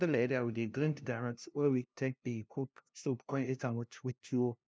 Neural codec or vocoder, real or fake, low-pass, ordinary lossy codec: codec, 16 kHz, 0.5 kbps, FunCodec, trained on LibriTTS, 25 frames a second; fake; none; none